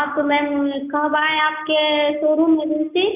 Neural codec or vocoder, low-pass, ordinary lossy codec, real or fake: none; 3.6 kHz; none; real